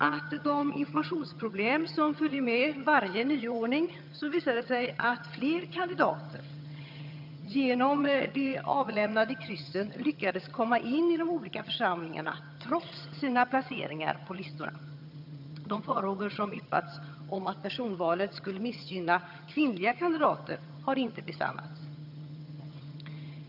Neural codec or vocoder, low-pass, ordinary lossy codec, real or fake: vocoder, 22.05 kHz, 80 mel bands, HiFi-GAN; 5.4 kHz; none; fake